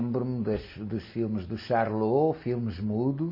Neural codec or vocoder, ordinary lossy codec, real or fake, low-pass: none; MP3, 24 kbps; real; 5.4 kHz